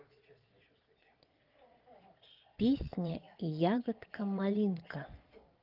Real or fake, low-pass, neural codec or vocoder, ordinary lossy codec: fake; 5.4 kHz; vocoder, 22.05 kHz, 80 mel bands, Vocos; Opus, 24 kbps